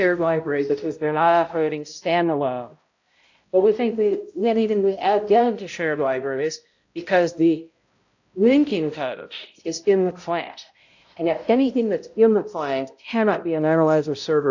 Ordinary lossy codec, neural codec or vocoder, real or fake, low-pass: AAC, 48 kbps; codec, 16 kHz, 0.5 kbps, X-Codec, HuBERT features, trained on balanced general audio; fake; 7.2 kHz